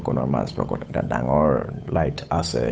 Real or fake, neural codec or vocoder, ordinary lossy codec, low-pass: fake; codec, 16 kHz, 8 kbps, FunCodec, trained on Chinese and English, 25 frames a second; none; none